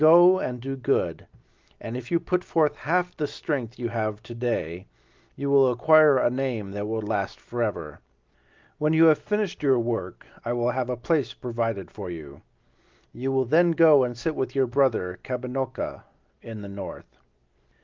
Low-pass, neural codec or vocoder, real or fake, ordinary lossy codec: 7.2 kHz; none; real; Opus, 32 kbps